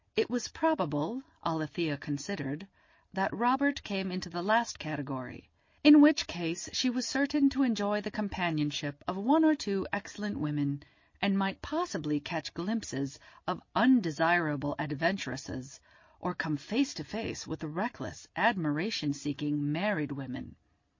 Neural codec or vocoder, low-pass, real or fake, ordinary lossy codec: none; 7.2 kHz; real; MP3, 32 kbps